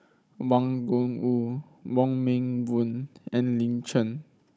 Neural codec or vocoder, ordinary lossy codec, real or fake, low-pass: codec, 16 kHz, 16 kbps, FunCodec, trained on Chinese and English, 50 frames a second; none; fake; none